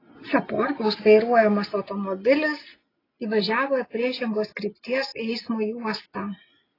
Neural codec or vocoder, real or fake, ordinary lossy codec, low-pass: none; real; AAC, 24 kbps; 5.4 kHz